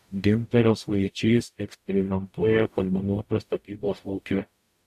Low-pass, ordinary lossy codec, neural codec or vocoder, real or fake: 14.4 kHz; AAC, 96 kbps; codec, 44.1 kHz, 0.9 kbps, DAC; fake